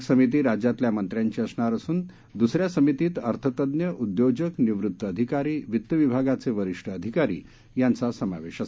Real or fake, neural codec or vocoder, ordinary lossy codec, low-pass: real; none; none; none